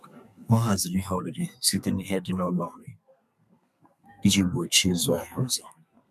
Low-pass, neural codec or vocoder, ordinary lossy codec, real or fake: 14.4 kHz; codec, 32 kHz, 1.9 kbps, SNAC; none; fake